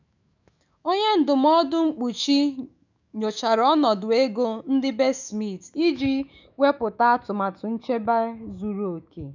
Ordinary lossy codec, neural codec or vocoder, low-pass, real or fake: none; autoencoder, 48 kHz, 128 numbers a frame, DAC-VAE, trained on Japanese speech; 7.2 kHz; fake